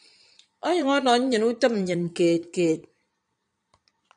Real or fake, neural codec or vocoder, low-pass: fake; vocoder, 22.05 kHz, 80 mel bands, Vocos; 9.9 kHz